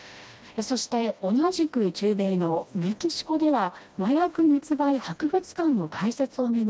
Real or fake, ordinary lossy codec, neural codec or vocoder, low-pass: fake; none; codec, 16 kHz, 1 kbps, FreqCodec, smaller model; none